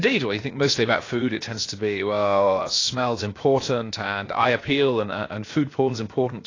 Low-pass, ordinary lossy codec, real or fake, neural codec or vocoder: 7.2 kHz; AAC, 32 kbps; fake; codec, 16 kHz, 0.7 kbps, FocalCodec